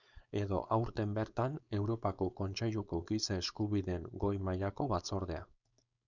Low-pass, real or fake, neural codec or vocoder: 7.2 kHz; fake; codec, 16 kHz, 4.8 kbps, FACodec